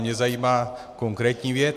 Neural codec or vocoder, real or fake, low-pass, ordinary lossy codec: none; real; 14.4 kHz; MP3, 96 kbps